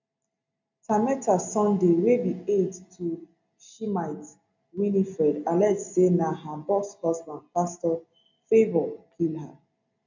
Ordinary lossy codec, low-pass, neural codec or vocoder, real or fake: none; 7.2 kHz; none; real